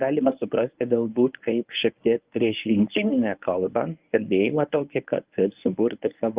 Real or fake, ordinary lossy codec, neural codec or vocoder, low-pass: fake; Opus, 32 kbps; codec, 24 kHz, 0.9 kbps, WavTokenizer, medium speech release version 1; 3.6 kHz